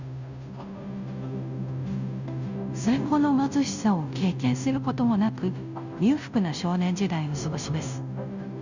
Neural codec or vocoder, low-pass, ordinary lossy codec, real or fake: codec, 16 kHz, 0.5 kbps, FunCodec, trained on Chinese and English, 25 frames a second; 7.2 kHz; none; fake